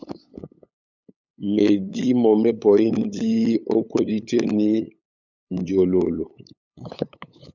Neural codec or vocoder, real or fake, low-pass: codec, 16 kHz, 8 kbps, FunCodec, trained on LibriTTS, 25 frames a second; fake; 7.2 kHz